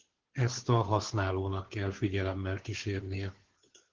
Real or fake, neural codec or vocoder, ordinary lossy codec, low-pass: fake; codec, 16 kHz, 2 kbps, FunCodec, trained on Chinese and English, 25 frames a second; Opus, 16 kbps; 7.2 kHz